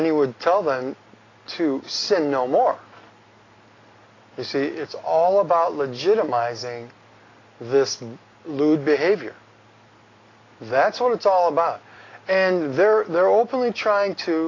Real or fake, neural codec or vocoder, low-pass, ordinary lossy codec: real; none; 7.2 kHz; AAC, 32 kbps